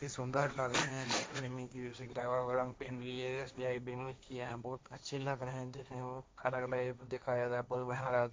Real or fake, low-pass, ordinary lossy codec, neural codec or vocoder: fake; none; none; codec, 16 kHz, 1.1 kbps, Voila-Tokenizer